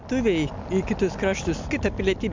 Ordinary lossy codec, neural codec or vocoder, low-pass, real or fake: MP3, 64 kbps; none; 7.2 kHz; real